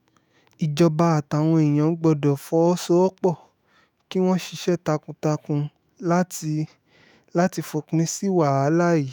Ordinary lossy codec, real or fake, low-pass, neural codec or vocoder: none; fake; none; autoencoder, 48 kHz, 128 numbers a frame, DAC-VAE, trained on Japanese speech